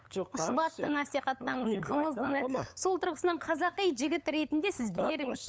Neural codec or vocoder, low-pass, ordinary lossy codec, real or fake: codec, 16 kHz, 8 kbps, FunCodec, trained on LibriTTS, 25 frames a second; none; none; fake